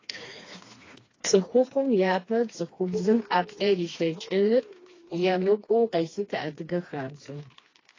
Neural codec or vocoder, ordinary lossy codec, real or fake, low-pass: codec, 16 kHz, 2 kbps, FreqCodec, smaller model; AAC, 32 kbps; fake; 7.2 kHz